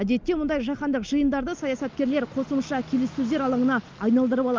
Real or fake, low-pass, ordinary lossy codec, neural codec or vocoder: real; 7.2 kHz; Opus, 32 kbps; none